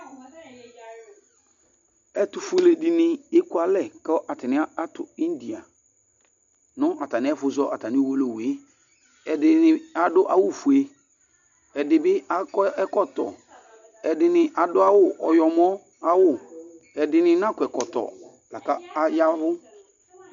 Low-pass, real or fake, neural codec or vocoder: 7.2 kHz; real; none